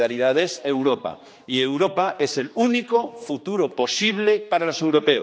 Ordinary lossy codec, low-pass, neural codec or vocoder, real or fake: none; none; codec, 16 kHz, 2 kbps, X-Codec, HuBERT features, trained on general audio; fake